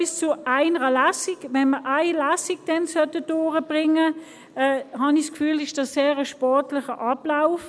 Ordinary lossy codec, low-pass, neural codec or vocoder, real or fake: none; none; none; real